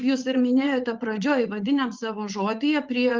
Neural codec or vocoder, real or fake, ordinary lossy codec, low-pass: vocoder, 44.1 kHz, 80 mel bands, Vocos; fake; Opus, 32 kbps; 7.2 kHz